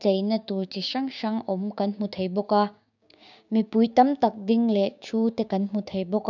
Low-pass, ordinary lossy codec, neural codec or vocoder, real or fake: 7.2 kHz; none; codec, 16 kHz, 6 kbps, DAC; fake